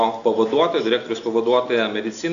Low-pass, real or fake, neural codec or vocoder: 7.2 kHz; real; none